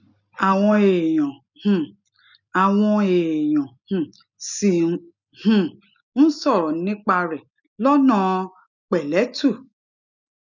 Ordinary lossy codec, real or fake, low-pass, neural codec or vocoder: none; real; 7.2 kHz; none